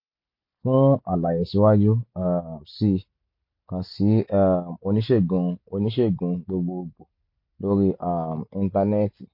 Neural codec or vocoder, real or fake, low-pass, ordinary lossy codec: none; real; 5.4 kHz; MP3, 32 kbps